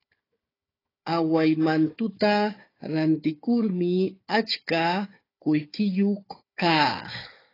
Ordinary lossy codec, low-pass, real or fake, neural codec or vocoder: AAC, 24 kbps; 5.4 kHz; fake; codec, 16 kHz, 4 kbps, FunCodec, trained on Chinese and English, 50 frames a second